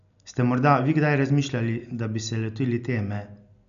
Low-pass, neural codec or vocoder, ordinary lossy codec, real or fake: 7.2 kHz; none; none; real